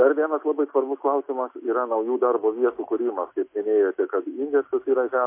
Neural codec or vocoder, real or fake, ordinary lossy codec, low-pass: none; real; MP3, 24 kbps; 3.6 kHz